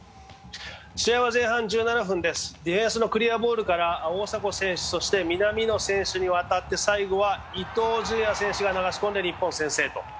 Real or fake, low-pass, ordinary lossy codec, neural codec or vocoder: real; none; none; none